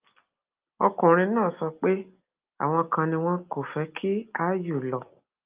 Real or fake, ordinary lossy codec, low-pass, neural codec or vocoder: real; Opus, 24 kbps; 3.6 kHz; none